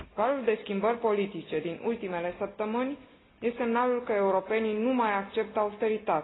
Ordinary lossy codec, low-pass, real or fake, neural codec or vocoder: AAC, 16 kbps; 7.2 kHz; real; none